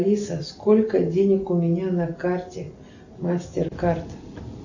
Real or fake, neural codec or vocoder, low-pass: real; none; 7.2 kHz